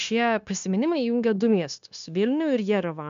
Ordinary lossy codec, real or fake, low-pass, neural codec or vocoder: MP3, 64 kbps; fake; 7.2 kHz; codec, 16 kHz, 0.9 kbps, LongCat-Audio-Codec